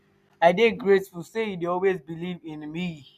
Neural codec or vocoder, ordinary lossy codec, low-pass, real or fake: none; none; 14.4 kHz; real